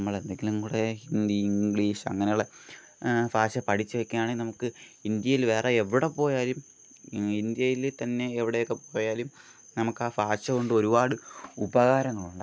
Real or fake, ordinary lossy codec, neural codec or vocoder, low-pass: real; none; none; none